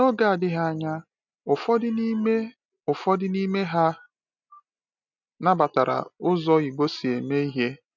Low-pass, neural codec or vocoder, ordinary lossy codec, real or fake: 7.2 kHz; none; none; real